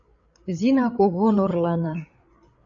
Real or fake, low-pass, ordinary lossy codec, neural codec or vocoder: fake; 7.2 kHz; AAC, 48 kbps; codec, 16 kHz, 8 kbps, FreqCodec, larger model